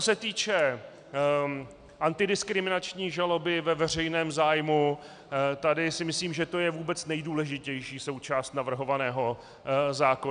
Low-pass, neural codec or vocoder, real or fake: 9.9 kHz; none; real